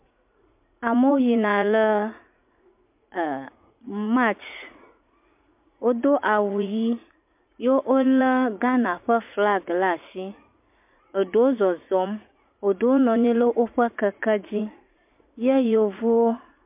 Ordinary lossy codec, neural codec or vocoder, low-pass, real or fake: MP3, 32 kbps; vocoder, 44.1 kHz, 80 mel bands, Vocos; 3.6 kHz; fake